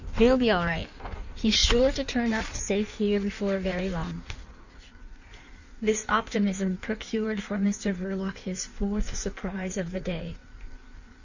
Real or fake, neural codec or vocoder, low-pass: fake; codec, 16 kHz in and 24 kHz out, 1.1 kbps, FireRedTTS-2 codec; 7.2 kHz